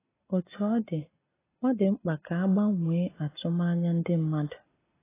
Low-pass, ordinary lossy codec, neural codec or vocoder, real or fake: 3.6 kHz; AAC, 16 kbps; none; real